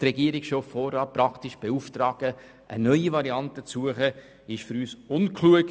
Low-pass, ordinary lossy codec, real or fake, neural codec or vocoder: none; none; real; none